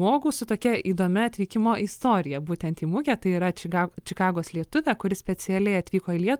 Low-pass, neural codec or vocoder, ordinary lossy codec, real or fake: 19.8 kHz; none; Opus, 32 kbps; real